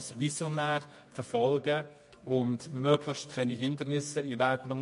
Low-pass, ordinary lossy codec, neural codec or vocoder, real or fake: 10.8 kHz; MP3, 48 kbps; codec, 24 kHz, 0.9 kbps, WavTokenizer, medium music audio release; fake